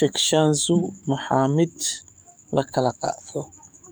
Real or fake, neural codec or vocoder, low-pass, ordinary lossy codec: fake; codec, 44.1 kHz, 7.8 kbps, DAC; none; none